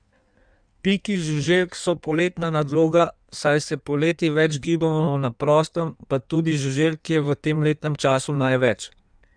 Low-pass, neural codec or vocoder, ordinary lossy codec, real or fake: 9.9 kHz; codec, 16 kHz in and 24 kHz out, 1.1 kbps, FireRedTTS-2 codec; none; fake